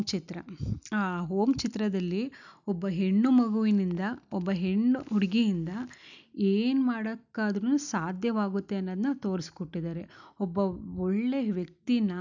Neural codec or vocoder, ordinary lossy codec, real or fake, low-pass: none; none; real; 7.2 kHz